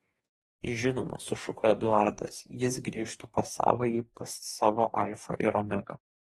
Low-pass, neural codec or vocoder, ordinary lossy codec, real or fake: 19.8 kHz; codec, 44.1 kHz, 2.6 kbps, DAC; AAC, 32 kbps; fake